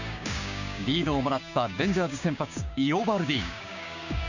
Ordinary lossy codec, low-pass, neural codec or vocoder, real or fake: none; 7.2 kHz; codec, 16 kHz, 6 kbps, DAC; fake